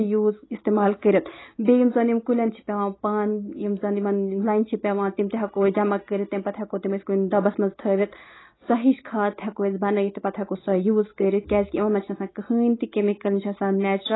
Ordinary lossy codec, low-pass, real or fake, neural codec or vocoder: AAC, 16 kbps; 7.2 kHz; real; none